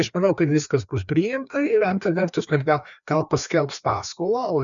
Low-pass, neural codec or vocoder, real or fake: 7.2 kHz; codec, 16 kHz, 2 kbps, FreqCodec, larger model; fake